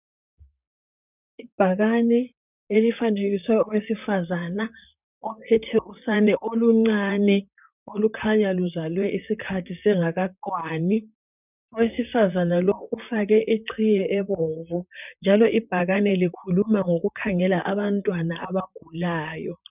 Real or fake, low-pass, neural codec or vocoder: fake; 3.6 kHz; vocoder, 44.1 kHz, 128 mel bands, Pupu-Vocoder